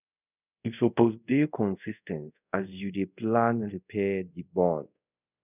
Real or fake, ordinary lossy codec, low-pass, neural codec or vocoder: fake; none; 3.6 kHz; codec, 24 kHz, 0.5 kbps, DualCodec